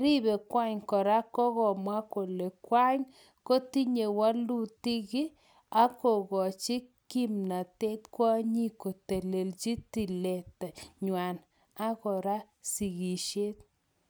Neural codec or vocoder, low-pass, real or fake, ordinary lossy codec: none; none; real; none